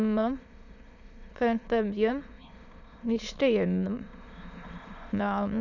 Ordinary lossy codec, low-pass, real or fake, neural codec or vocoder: none; 7.2 kHz; fake; autoencoder, 22.05 kHz, a latent of 192 numbers a frame, VITS, trained on many speakers